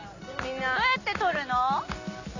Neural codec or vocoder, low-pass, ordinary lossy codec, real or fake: none; 7.2 kHz; none; real